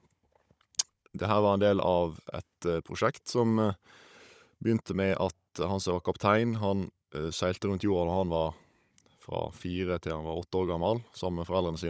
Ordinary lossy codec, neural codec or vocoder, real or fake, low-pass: none; codec, 16 kHz, 16 kbps, FunCodec, trained on Chinese and English, 50 frames a second; fake; none